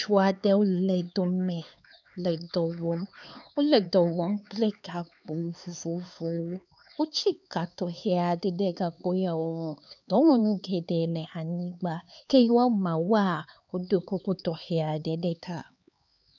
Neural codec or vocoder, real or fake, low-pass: codec, 16 kHz, 4 kbps, X-Codec, HuBERT features, trained on LibriSpeech; fake; 7.2 kHz